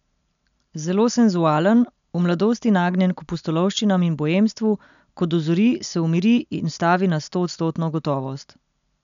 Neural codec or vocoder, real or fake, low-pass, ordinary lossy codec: none; real; 7.2 kHz; none